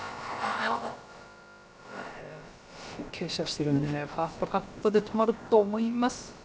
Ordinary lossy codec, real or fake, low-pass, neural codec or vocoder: none; fake; none; codec, 16 kHz, about 1 kbps, DyCAST, with the encoder's durations